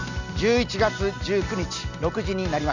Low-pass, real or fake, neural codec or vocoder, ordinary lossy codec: 7.2 kHz; real; none; none